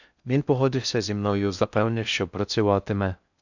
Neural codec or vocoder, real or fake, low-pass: codec, 16 kHz in and 24 kHz out, 0.6 kbps, FocalCodec, streaming, 4096 codes; fake; 7.2 kHz